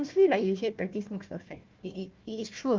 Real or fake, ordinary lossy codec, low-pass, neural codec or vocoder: fake; Opus, 24 kbps; 7.2 kHz; codec, 16 kHz, 1 kbps, FunCodec, trained on Chinese and English, 50 frames a second